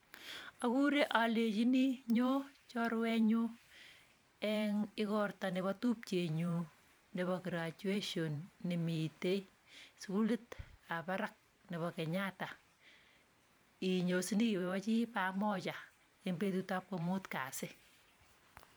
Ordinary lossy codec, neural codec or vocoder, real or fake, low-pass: none; vocoder, 44.1 kHz, 128 mel bands every 512 samples, BigVGAN v2; fake; none